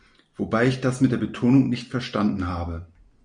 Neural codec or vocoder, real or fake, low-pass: none; real; 9.9 kHz